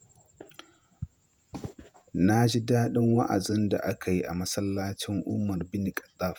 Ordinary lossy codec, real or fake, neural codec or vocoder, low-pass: none; fake; vocoder, 48 kHz, 128 mel bands, Vocos; none